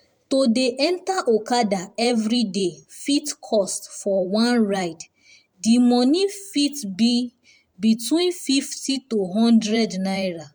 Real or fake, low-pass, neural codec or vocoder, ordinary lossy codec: fake; 19.8 kHz; vocoder, 44.1 kHz, 128 mel bands every 512 samples, BigVGAN v2; MP3, 96 kbps